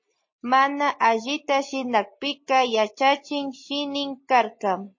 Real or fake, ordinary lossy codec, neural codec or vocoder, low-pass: real; MP3, 32 kbps; none; 7.2 kHz